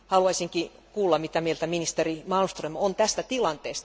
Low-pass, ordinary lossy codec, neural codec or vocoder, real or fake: none; none; none; real